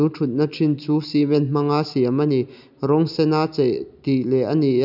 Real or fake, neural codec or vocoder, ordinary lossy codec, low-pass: real; none; none; 5.4 kHz